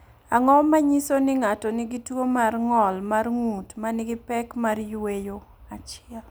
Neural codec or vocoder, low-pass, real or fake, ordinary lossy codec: none; none; real; none